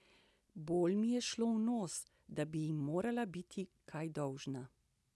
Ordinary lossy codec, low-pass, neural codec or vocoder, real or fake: none; none; none; real